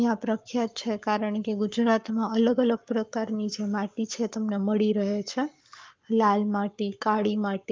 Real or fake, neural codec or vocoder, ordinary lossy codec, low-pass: fake; codec, 44.1 kHz, 7.8 kbps, Pupu-Codec; Opus, 24 kbps; 7.2 kHz